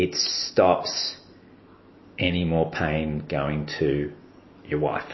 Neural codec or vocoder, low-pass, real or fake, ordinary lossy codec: none; 7.2 kHz; real; MP3, 24 kbps